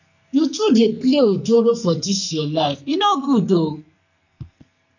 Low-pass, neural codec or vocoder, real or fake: 7.2 kHz; codec, 44.1 kHz, 2.6 kbps, SNAC; fake